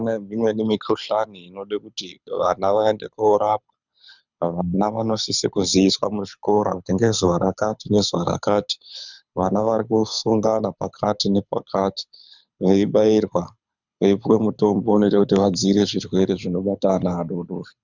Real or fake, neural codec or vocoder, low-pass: fake; codec, 24 kHz, 6 kbps, HILCodec; 7.2 kHz